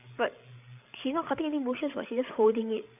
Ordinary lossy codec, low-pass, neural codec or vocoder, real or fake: none; 3.6 kHz; codec, 16 kHz, 16 kbps, FreqCodec, larger model; fake